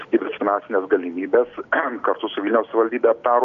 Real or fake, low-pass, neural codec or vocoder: real; 7.2 kHz; none